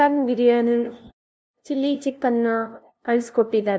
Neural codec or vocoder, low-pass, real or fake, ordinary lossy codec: codec, 16 kHz, 0.5 kbps, FunCodec, trained on LibriTTS, 25 frames a second; none; fake; none